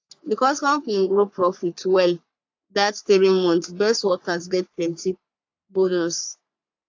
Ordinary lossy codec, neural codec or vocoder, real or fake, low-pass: AAC, 48 kbps; codec, 44.1 kHz, 3.4 kbps, Pupu-Codec; fake; 7.2 kHz